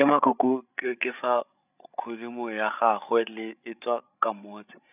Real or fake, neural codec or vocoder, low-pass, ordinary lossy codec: fake; codec, 16 kHz, 16 kbps, FreqCodec, larger model; 3.6 kHz; none